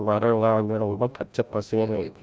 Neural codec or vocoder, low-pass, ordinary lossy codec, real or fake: codec, 16 kHz, 0.5 kbps, FreqCodec, larger model; none; none; fake